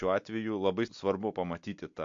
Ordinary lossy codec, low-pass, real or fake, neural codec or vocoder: MP3, 48 kbps; 7.2 kHz; real; none